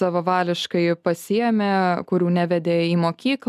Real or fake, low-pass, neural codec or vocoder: real; 14.4 kHz; none